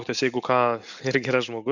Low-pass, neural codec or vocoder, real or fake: 7.2 kHz; none; real